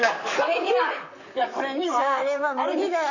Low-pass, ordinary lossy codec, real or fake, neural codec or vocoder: 7.2 kHz; none; fake; vocoder, 44.1 kHz, 128 mel bands, Pupu-Vocoder